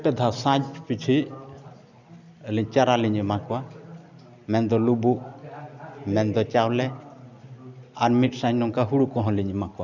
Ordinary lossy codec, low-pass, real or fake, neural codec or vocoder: none; 7.2 kHz; real; none